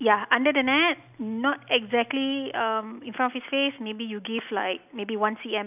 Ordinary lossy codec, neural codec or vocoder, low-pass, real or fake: none; none; 3.6 kHz; real